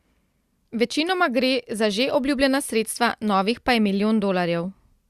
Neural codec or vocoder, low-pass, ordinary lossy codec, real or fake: none; 14.4 kHz; Opus, 64 kbps; real